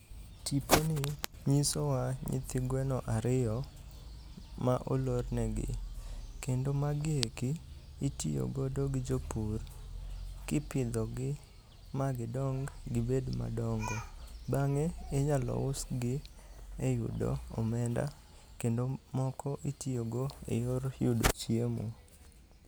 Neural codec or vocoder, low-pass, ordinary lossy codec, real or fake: none; none; none; real